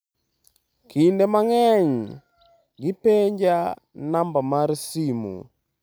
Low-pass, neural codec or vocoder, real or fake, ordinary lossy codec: none; none; real; none